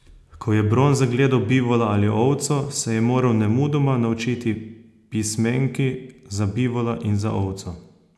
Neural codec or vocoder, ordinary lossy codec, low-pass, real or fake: none; none; none; real